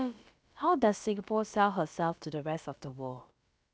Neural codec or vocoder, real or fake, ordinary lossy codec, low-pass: codec, 16 kHz, about 1 kbps, DyCAST, with the encoder's durations; fake; none; none